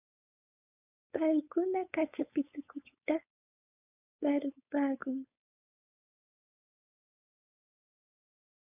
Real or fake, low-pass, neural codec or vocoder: fake; 3.6 kHz; codec, 16 kHz, 4.8 kbps, FACodec